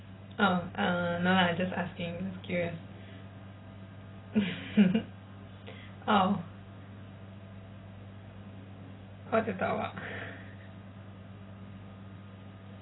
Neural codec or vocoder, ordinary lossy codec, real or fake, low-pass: none; AAC, 16 kbps; real; 7.2 kHz